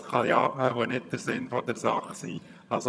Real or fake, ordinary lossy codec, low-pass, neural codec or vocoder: fake; none; none; vocoder, 22.05 kHz, 80 mel bands, HiFi-GAN